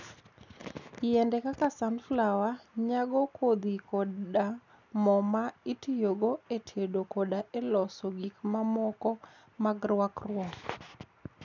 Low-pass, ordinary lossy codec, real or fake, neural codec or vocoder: 7.2 kHz; none; real; none